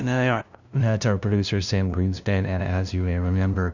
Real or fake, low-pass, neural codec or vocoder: fake; 7.2 kHz; codec, 16 kHz, 0.5 kbps, FunCodec, trained on LibriTTS, 25 frames a second